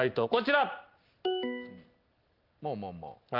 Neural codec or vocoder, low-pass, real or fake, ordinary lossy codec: none; 5.4 kHz; real; Opus, 32 kbps